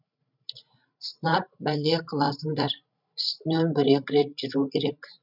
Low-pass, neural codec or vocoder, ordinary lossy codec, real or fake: 5.4 kHz; codec, 16 kHz, 16 kbps, FreqCodec, larger model; none; fake